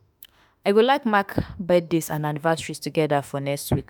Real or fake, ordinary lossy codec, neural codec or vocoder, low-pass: fake; none; autoencoder, 48 kHz, 32 numbers a frame, DAC-VAE, trained on Japanese speech; none